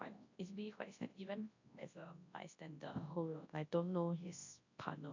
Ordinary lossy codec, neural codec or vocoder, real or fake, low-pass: AAC, 48 kbps; codec, 24 kHz, 0.9 kbps, WavTokenizer, large speech release; fake; 7.2 kHz